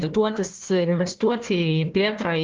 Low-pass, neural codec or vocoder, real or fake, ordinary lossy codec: 7.2 kHz; codec, 16 kHz, 1 kbps, FunCodec, trained on Chinese and English, 50 frames a second; fake; Opus, 16 kbps